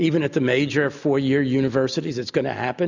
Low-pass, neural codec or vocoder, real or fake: 7.2 kHz; none; real